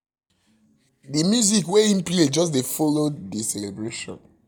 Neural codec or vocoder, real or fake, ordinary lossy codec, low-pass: none; real; none; 19.8 kHz